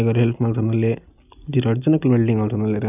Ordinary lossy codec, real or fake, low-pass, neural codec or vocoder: none; real; 3.6 kHz; none